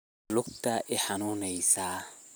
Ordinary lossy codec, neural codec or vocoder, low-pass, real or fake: none; none; none; real